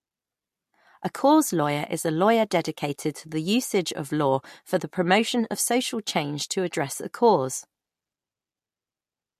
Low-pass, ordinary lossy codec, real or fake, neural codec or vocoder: 14.4 kHz; MP3, 64 kbps; real; none